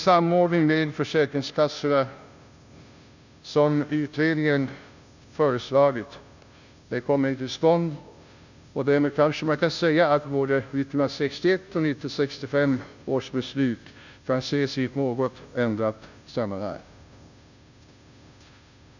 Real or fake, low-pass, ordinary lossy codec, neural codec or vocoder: fake; 7.2 kHz; none; codec, 16 kHz, 0.5 kbps, FunCodec, trained on Chinese and English, 25 frames a second